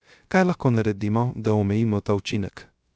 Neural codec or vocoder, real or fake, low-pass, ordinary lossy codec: codec, 16 kHz, 0.3 kbps, FocalCodec; fake; none; none